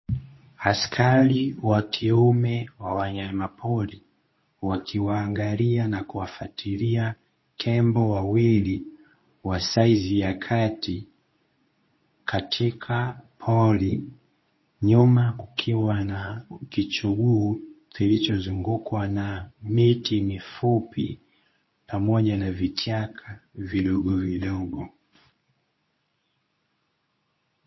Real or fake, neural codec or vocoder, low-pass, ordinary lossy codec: fake; codec, 24 kHz, 0.9 kbps, WavTokenizer, medium speech release version 2; 7.2 kHz; MP3, 24 kbps